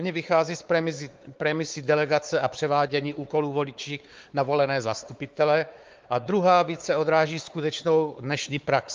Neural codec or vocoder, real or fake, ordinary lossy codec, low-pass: codec, 16 kHz, 4 kbps, X-Codec, WavLM features, trained on Multilingual LibriSpeech; fake; Opus, 32 kbps; 7.2 kHz